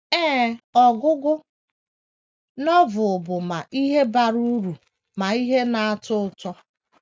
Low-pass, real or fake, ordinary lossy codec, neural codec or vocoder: none; real; none; none